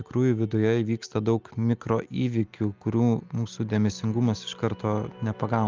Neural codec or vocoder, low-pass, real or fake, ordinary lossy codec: none; 7.2 kHz; real; Opus, 32 kbps